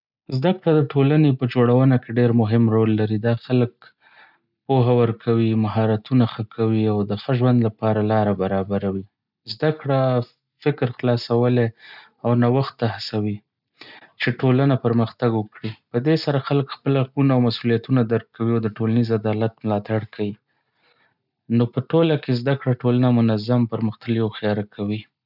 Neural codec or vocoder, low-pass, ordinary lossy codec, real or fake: none; 5.4 kHz; none; real